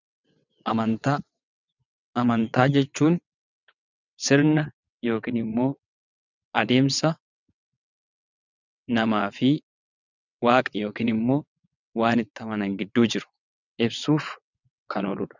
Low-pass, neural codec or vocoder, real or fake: 7.2 kHz; vocoder, 22.05 kHz, 80 mel bands, WaveNeXt; fake